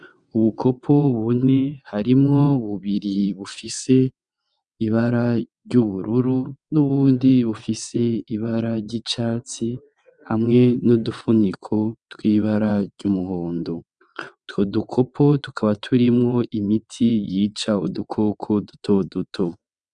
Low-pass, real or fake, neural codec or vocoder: 9.9 kHz; fake; vocoder, 22.05 kHz, 80 mel bands, WaveNeXt